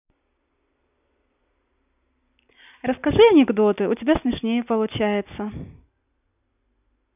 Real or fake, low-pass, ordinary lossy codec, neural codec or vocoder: real; 3.6 kHz; none; none